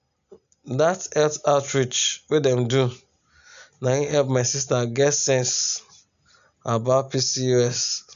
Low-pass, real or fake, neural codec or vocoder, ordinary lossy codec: 7.2 kHz; real; none; none